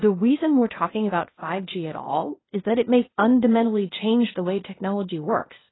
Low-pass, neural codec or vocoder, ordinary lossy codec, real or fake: 7.2 kHz; codec, 16 kHz, 0.8 kbps, ZipCodec; AAC, 16 kbps; fake